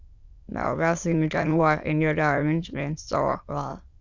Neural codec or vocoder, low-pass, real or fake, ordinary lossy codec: autoencoder, 22.05 kHz, a latent of 192 numbers a frame, VITS, trained on many speakers; 7.2 kHz; fake; Opus, 64 kbps